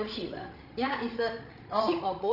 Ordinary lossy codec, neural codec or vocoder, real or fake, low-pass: none; codec, 16 kHz, 16 kbps, FreqCodec, larger model; fake; 5.4 kHz